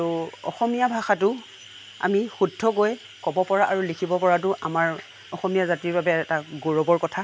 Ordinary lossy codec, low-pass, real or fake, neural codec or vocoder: none; none; real; none